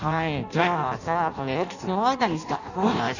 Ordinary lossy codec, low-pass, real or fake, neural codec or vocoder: none; 7.2 kHz; fake; codec, 16 kHz in and 24 kHz out, 0.6 kbps, FireRedTTS-2 codec